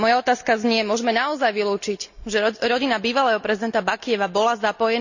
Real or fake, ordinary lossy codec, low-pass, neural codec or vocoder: real; none; 7.2 kHz; none